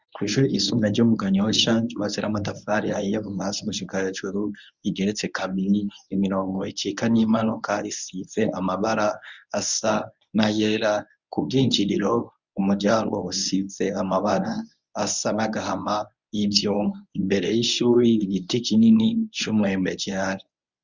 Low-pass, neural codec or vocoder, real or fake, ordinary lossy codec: 7.2 kHz; codec, 24 kHz, 0.9 kbps, WavTokenizer, medium speech release version 1; fake; Opus, 64 kbps